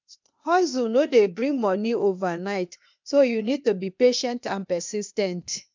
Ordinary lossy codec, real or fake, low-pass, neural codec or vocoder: MP3, 64 kbps; fake; 7.2 kHz; codec, 16 kHz, 0.8 kbps, ZipCodec